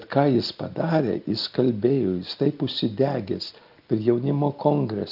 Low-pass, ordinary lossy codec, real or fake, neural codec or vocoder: 5.4 kHz; Opus, 24 kbps; real; none